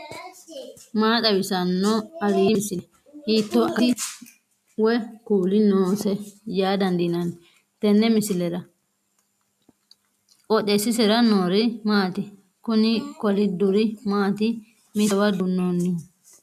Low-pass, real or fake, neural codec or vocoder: 14.4 kHz; real; none